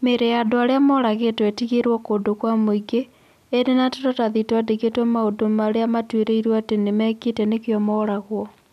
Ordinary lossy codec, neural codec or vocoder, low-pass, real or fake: none; none; 14.4 kHz; real